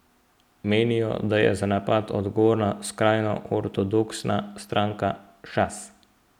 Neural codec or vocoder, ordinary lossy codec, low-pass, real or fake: none; none; 19.8 kHz; real